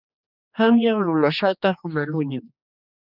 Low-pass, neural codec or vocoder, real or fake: 5.4 kHz; codec, 16 kHz, 2 kbps, X-Codec, HuBERT features, trained on general audio; fake